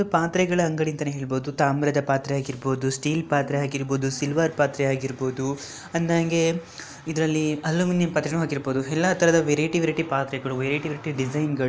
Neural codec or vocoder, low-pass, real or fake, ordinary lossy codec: none; none; real; none